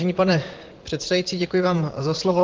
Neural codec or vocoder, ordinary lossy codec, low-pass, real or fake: vocoder, 44.1 kHz, 128 mel bands, Pupu-Vocoder; Opus, 16 kbps; 7.2 kHz; fake